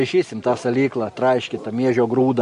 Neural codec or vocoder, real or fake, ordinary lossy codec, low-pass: none; real; MP3, 48 kbps; 14.4 kHz